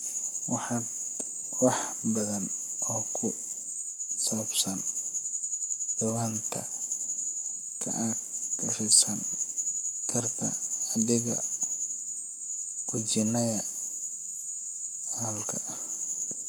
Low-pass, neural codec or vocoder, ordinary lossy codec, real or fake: none; codec, 44.1 kHz, 7.8 kbps, Pupu-Codec; none; fake